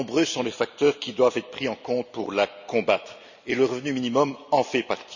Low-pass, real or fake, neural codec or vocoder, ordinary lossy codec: 7.2 kHz; real; none; none